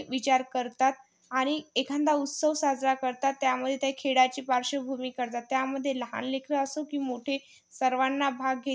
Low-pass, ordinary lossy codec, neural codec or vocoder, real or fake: none; none; none; real